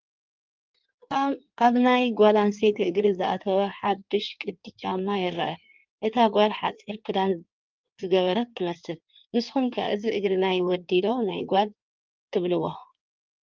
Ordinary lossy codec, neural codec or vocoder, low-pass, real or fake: Opus, 32 kbps; codec, 16 kHz in and 24 kHz out, 1.1 kbps, FireRedTTS-2 codec; 7.2 kHz; fake